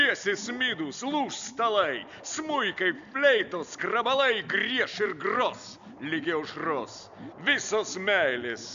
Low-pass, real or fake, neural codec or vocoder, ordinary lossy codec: 7.2 kHz; real; none; AAC, 64 kbps